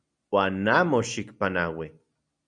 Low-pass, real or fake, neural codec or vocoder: 9.9 kHz; real; none